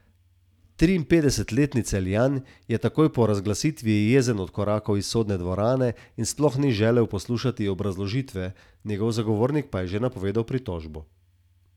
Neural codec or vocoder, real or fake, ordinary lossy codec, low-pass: none; real; none; 19.8 kHz